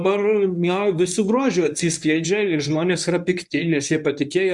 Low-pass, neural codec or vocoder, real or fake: 10.8 kHz; codec, 24 kHz, 0.9 kbps, WavTokenizer, medium speech release version 1; fake